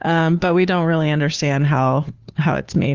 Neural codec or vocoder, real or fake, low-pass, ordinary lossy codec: codec, 16 kHz, 2 kbps, FunCodec, trained on Chinese and English, 25 frames a second; fake; 7.2 kHz; Opus, 32 kbps